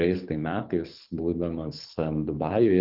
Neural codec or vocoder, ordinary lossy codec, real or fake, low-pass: codec, 24 kHz, 6 kbps, HILCodec; Opus, 32 kbps; fake; 5.4 kHz